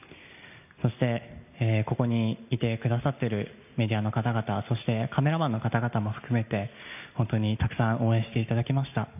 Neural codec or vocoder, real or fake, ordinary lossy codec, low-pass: none; real; none; 3.6 kHz